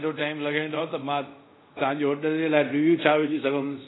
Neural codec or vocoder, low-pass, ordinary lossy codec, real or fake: codec, 24 kHz, 0.5 kbps, DualCodec; 7.2 kHz; AAC, 16 kbps; fake